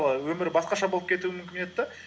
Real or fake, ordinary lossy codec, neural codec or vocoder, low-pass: real; none; none; none